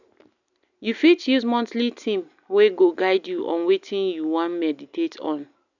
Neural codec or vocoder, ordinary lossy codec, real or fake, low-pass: none; none; real; 7.2 kHz